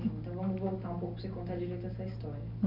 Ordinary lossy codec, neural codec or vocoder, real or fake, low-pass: none; none; real; 5.4 kHz